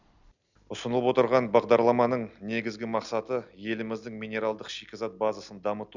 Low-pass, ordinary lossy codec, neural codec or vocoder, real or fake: 7.2 kHz; none; none; real